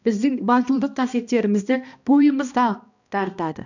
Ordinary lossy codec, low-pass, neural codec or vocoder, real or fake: none; 7.2 kHz; codec, 16 kHz, 1 kbps, X-Codec, HuBERT features, trained on balanced general audio; fake